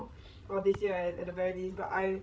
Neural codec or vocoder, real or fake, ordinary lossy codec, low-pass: codec, 16 kHz, 16 kbps, FreqCodec, larger model; fake; none; none